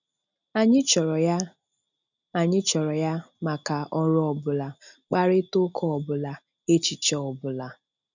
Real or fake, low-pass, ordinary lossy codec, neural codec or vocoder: real; 7.2 kHz; none; none